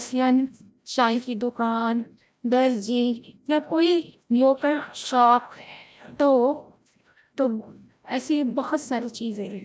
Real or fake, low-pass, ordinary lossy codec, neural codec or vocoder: fake; none; none; codec, 16 kHz, 0.5 kbps, FreqCodec, larger model